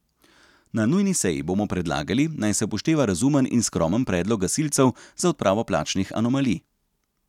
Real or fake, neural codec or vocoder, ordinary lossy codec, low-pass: real; none; none; 19.8 kHz